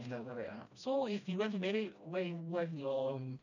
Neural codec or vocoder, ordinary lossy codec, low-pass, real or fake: codec, 16 kHz, 1 kbps, FreqCodec, smaller model; none; 7.2 kHz; fake